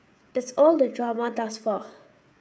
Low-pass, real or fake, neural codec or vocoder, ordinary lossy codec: none; fake; codec, 16 kHz, 16 kbps, FreqCodec, smaller model; none